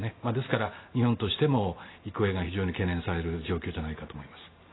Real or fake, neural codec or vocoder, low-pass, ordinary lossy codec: real; none; 7.2 kHz; AAC, 16 kbps